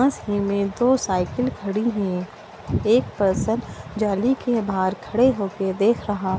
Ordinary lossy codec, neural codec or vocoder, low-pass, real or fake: none; none; none; real